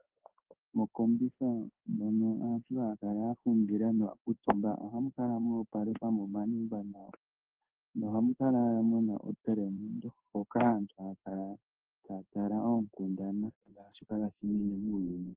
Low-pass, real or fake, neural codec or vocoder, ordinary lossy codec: 3.6 kHz; fake; codec, 16 kHz in and 24 kHz out, 1 kbps, XY-Tokenizer; Opus, 16 kbps